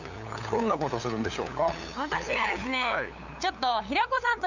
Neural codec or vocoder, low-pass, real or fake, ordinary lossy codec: codec, 16 kHz, 8 kbps, FunCodec, trained on LibriTTS, 25 frames a second; 7.2 kHz; fake; none